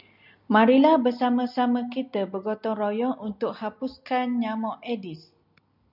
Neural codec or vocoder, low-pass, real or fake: none; 5.4 kHz; real